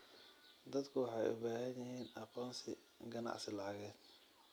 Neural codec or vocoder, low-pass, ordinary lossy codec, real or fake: none; none; none; real